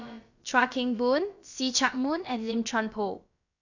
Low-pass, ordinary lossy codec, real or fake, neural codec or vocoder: 7.2 kHz; none; fake; codec, 16 kHz, about 1 kbps, DyCAST, with the encoder's durations